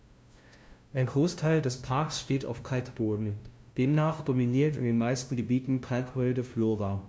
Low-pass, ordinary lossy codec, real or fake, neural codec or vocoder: none; none; fake; codec, 16 kHz, 0.5 kbps, FunCodec, trained on LibriTTS, 25 frames a second